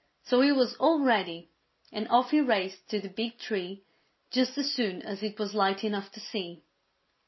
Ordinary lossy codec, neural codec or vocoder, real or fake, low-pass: MP3, 24 kbps; none; real; 7.2 kHz